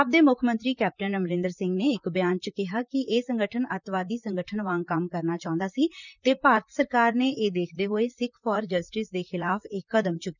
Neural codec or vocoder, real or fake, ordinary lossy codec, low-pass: vocoder, 44.1 kHz, 128 mel bands, Pupu-Vocoder; fake; none; 7.2 kHz